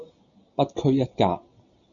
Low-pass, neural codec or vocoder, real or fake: 7.2 kHz; none; real